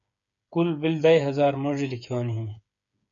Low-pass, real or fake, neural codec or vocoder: 7.2 kHz; fake; codec, 16 kHz, 8 kbps, FreqCodec, smaller model